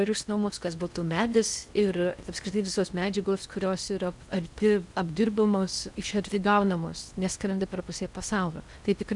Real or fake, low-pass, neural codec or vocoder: fake; 10.8 kHz; codec, 16 kHz in and 24 kHz out, 0.6 kbps, FocalCodec, streaming, 4096 codes